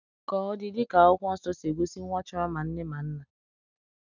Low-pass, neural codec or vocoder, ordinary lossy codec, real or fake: 7.2 kHz; none; none; real